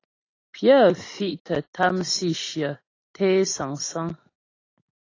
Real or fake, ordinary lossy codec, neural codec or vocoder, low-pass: real; AAC, 32 kbps; none; 7.2 kHz